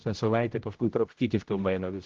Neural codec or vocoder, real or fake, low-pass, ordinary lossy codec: codec, 16 kHz, 0.5 kbps, X-Codec, HuBERT features, trained on general audio; fake; 7.2 kHz; Opus, 16 kbps